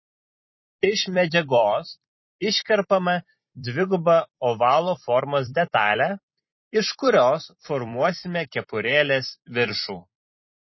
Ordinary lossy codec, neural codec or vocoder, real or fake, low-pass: MP3, 24 kbps; vocoder, 44.1 kHz, 128 mel bands every 256 samples, BigVGAN v2; fake; 7.2 kHz